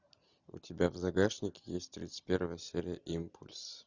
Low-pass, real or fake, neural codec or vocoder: 7.2 kHz; real; none